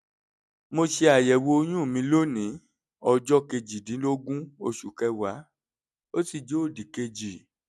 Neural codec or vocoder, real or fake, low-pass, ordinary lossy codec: none; real; none; none